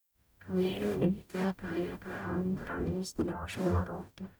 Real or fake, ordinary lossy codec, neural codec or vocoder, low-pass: fake; none; codec, 44.1 kHz, 0.9 kbps, DAC; none